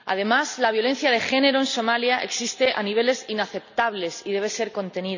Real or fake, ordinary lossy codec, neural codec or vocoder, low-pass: real; none; none; 7.2 kHz